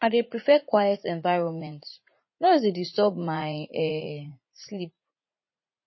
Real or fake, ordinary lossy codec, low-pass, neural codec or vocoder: fake; MP3, 24 kbps; 7.2 kHz; vocoder, 44.1 kHz, 80 mel bands, Vocos